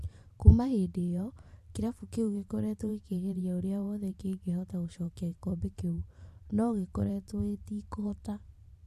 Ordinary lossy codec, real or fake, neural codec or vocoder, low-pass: MP3, 64 kbps; fake; vocoder, 44.1 kHz, 128 mel bands every 512 samples, BigVGAN v2; 14.4 kHz